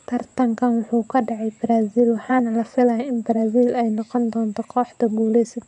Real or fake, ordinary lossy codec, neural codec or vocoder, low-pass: fake; none; vocoder, 24 kHz, 100 mel bands, Vocos; 10.8 kHz